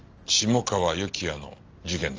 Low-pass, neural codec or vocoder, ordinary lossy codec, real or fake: 7.2 kHz; none; Opus, 24 kbps; real